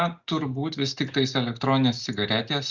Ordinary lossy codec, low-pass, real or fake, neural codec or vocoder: Opus, 64 kbps; 7.2 kHz; real; none